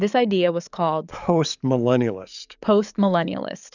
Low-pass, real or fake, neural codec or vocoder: 7.2 kHz; fake; codec, 44.1 kHz, 7.8 kbps, DAC